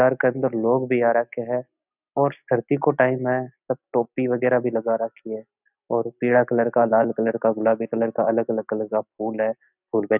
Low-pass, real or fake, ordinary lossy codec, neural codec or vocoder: 3.6 kHz; real; none; none